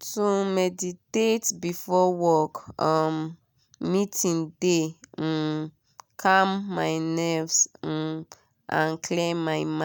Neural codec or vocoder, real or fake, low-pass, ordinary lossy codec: none; real; none; none